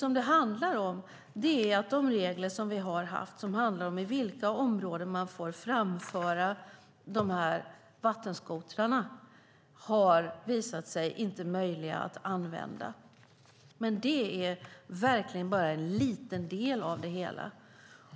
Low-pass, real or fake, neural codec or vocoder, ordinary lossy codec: none; real; none; none